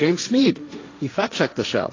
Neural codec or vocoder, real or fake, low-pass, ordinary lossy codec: codec, 16 kHz, 1.1 kbps, Voila-Tokenizer; fake; 7.2 kHz; AAC, 32 kbps